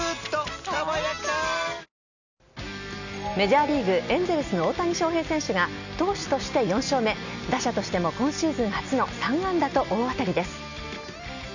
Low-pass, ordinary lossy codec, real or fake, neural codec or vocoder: 7.2 kHz; none; real; none